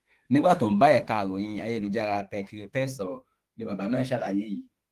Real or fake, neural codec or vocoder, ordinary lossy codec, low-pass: fake; autoencoder, 48 kHz, 32 numbers a frame, DAC-VAE, trained on Japanese speech; Opus, 24 kbps; 14.4 kHz